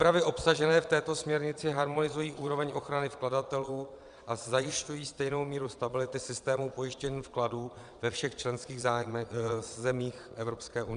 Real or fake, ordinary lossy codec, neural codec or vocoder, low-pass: fake; Opus, 64 kbps; vocoder, 22.05 kHz, 80 mel bands, Vocos; 9.9 kHz